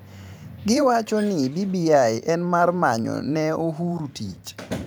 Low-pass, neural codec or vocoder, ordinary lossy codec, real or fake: none; vocoder, 44.1 kHz, 128 mel bands every 512 samples, BigVGAN v2; none; fake